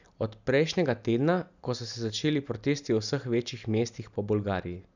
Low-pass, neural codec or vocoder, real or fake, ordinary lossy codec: 7.2 kHz; none; real; none